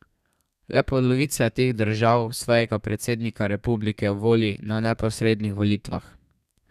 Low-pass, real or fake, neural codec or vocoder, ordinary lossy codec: 14.4 kHz; fake; codec, 32 kHz, 1.9 kbps, SNAC; none